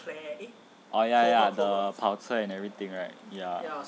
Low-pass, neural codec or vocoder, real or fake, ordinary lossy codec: none; none; real; none